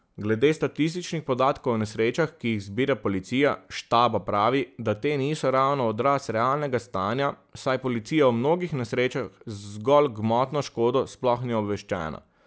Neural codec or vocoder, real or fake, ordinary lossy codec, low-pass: none; real; none; none